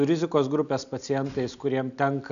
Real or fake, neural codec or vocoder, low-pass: real; none; 7.2 kHz